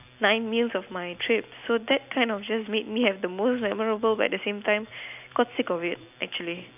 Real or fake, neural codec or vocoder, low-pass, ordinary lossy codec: real; none; 3.6 kHz; none